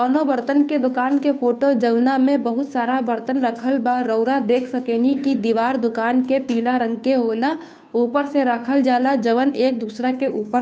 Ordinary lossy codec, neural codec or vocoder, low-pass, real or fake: none; codec, 16 kHz, 2 kbps, FunCodec, trained on Chinese and English, 25 frames a second; none; fake